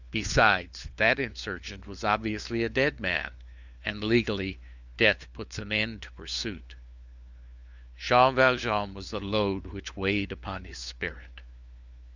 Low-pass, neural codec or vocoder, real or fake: 7.2 kHz; codec, 16 kHz, 4 kbps, FunCodec, trained on LibriTTS, 50 frames a second; fake